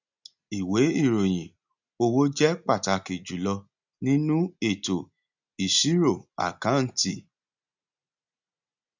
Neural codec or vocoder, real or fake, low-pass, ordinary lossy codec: none; real; 7.2 kHz; none